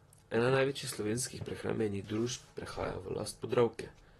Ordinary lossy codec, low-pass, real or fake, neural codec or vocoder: AAC, 32 kbps; 19.8 kHz; fake; vocoder, 44.1 kHz, 128 mel bands, Pupu-Vocoder